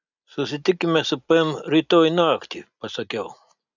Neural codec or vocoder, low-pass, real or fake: none; 7.2 kHz; real